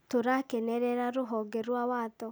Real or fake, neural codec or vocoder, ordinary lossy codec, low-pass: real; none; none; none